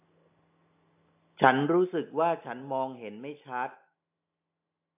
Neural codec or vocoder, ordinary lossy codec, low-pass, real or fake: none; AAC, 24 kbps; 3.6 kHz; real